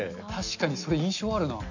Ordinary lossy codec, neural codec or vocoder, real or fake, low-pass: none; none; real; 7.2 kHz